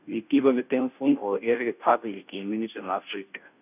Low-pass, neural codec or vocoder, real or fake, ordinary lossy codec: 3.6 kHz; codec, 16 kHz, 0.5 kbps, FunCodec, trained on Chinese and English, 25 frames a second; fake; none